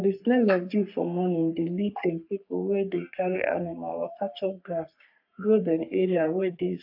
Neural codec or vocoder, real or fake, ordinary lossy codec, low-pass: codec, 44.1 kHz, 3.4 kbps, Pupu-Codec; fake; AAC, 48 kbps; 5.4 kHz